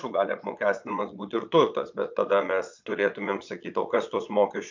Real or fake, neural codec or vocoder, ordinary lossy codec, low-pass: fake; vocoder, 22.05 kHz, 80 mel bands, Vocos; MP3, 64 kbps; 7.2 kHz